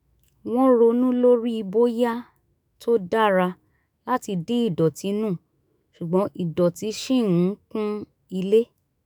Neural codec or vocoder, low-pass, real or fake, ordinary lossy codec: autoencoder, 48 kHz, 128 numbers a frame, DAC-VAE, trained on Japanese speech; none; fake; none